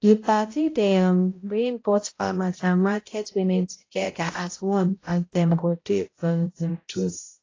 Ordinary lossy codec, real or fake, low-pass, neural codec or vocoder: AAC, 32 kbps; fake; 7.2 kHz; codec, 16 kHz, 0.5 kbps, X-Codec, HuBERT features, trained on balanced general audio